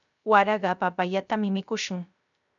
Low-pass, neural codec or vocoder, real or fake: 7.2 kHz; codec, 16 kHz, 0.3 kbps, FocalCodec; fake